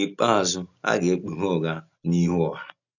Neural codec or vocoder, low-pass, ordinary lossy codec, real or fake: none; 7.2 kHz; none; real